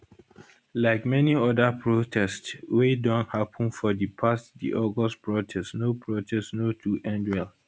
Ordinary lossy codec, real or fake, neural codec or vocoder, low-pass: none; real; none; none